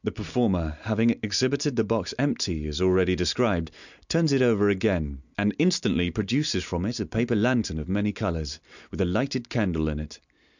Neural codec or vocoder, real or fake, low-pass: none; real; 7.2 kHz